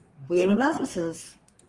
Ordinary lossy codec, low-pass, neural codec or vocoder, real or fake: Opus, 24 kbps; 10.8 kHz; codec, 24 kHz, 1 kbps, SNAC; fake